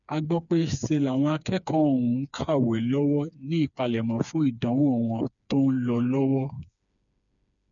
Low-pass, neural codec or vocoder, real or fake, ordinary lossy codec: 7.2 kHz; codec, 16 kHz, 4 kbps, FreqCodec, smaller model; fake; none